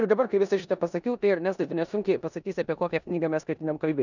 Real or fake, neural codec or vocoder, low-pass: fake; codec, 16 kHz in and 24 kHz out, 0.9 kbps, LongCat-Audio-Codec, four codebook decoder; 7.2 kHz